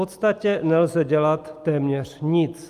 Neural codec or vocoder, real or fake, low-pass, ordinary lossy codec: none; real; 14.4 kHz; Opus, 32 kbps